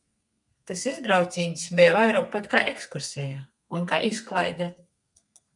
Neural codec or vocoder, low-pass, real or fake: codec, 32 kHz, 1.9 kbps, SNAC; 10.8 kHz; fake